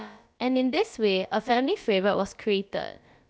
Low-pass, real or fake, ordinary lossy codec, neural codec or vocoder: none; fake; none; codec, 16 kHz, about 1 kbps, DyCAST, with the encoder's durations